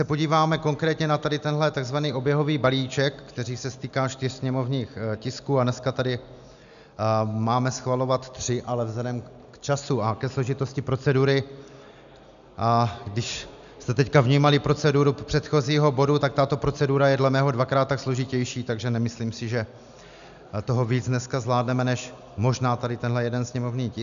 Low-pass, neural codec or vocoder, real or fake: 7.2 kHz; none; real